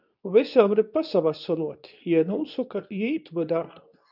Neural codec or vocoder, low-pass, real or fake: codec, 24 kHz, 0.9 kbps, WavTokenizer, medium speech release version 2; 5.4 kHz; fake